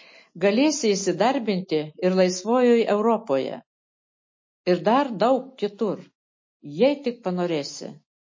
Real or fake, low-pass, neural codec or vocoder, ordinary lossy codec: real; 7.2 kHz; none; MP3, 32 kbps